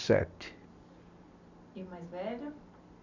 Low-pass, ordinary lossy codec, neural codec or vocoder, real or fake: 7.2 kHz; none; none; real